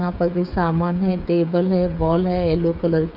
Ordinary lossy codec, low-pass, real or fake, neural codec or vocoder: none; 5.4 kHz; fake; codec, 16 kHz, 16 kbps, FreqCodec, smaller model